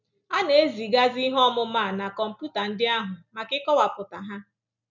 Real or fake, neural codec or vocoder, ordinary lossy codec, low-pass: real; none; none; 7.2 kHz